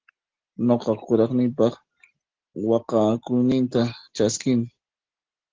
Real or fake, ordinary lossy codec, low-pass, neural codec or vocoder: real; Opus, 16 kbps; 7.2 kHz; none